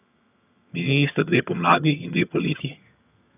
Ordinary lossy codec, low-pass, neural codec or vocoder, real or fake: none; 3.6 kHz; vocoder, 22.05 kHz, 80 mel bands, HiFi-GAN; fake